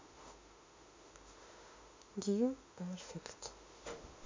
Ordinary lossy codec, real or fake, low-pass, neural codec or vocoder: MP3, 48 kbps; fake; 7.2 kHz; autoencoder, 48 kHz, 32 numbers a frame, DAC-VAE, trained on Japanese speech